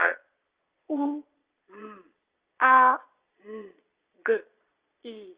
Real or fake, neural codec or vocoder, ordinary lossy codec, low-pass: fake; vocoder, 22.05 kHz, 80 mel bands, Vocos; Opus, 32 kbps; 3.6 kHz